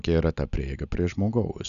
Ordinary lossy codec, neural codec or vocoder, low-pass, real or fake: MP3, 96 kbps; none; 7.2 kHz; real